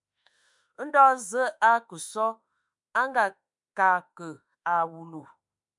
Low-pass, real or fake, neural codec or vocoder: 10.8 kHz; fake; autoencoder, 48 kHz, 32 numbers a frame, DAC-VAE, trained on Japanese speech